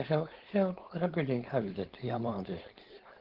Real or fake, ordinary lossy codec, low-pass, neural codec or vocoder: fake; Opus, 16 kbps; 5.4 kHz; codec, 16 kHz, 4.8 kbps, FACodec